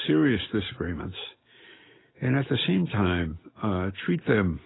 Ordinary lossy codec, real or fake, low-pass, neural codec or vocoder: AAC, 16 kbps; real; 7.2 kHz; none